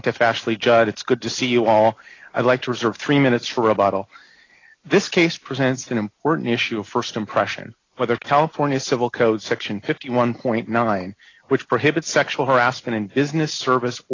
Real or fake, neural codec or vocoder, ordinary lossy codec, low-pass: real; none; AAC, 32 kbps; 7.2 kHz